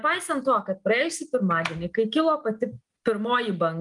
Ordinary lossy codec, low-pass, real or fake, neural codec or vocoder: Opus, 32 kbps; 10.8 kHz; real; none